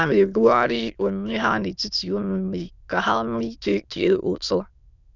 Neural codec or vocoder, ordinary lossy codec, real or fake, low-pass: autoencoder, 22.05 kHz, a latent of 192 numbers a frame, VITS, trained on many speakers; none; fake; 7.2 kHz